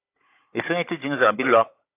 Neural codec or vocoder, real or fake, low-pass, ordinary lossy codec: codec, 16 kHz, 16 kbps, FunCodec, trained on Chinese and English, 50 frames a second; fake; 3.6 kHz; AAC, 24 kbps